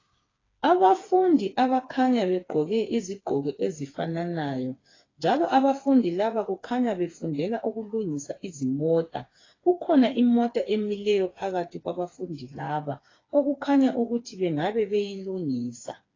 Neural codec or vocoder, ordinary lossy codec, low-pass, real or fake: codec, 16 kHz, 4 kbps, FreqCodec, smaller model; AAC, 32 kbps; 7.2 kHz; fake